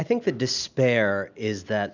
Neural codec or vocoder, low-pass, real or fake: none; 7.2 kHz; real